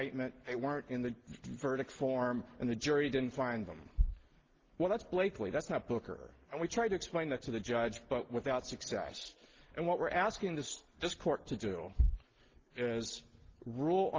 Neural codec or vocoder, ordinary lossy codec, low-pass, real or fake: none; Opus, 16 kbps; 7.2 kHz; real